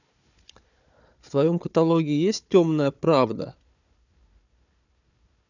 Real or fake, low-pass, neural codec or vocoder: fake; 7.2 kHz; codec, 16 kHz, 4 kbps, FunCodec, trained on Chinese and English, 50 frames a second